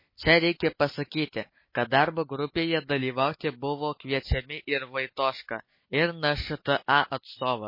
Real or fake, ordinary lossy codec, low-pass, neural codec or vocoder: real; MP3, 24 kbps; 5.4 kHz; none